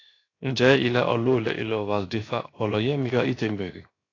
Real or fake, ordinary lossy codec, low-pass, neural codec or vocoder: fake; AAC, 32 kbps; 7.2 kHz; codec, 16 kHz, 0.7 kbps, FocalCodec